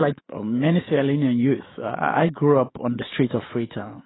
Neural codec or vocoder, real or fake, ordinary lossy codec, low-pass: autoencoder, 48 kHz, 128 numbers a frame, DAC-VAE, trained on Japanese speech; fake; AAC, 16 kbps; 7.2 kHz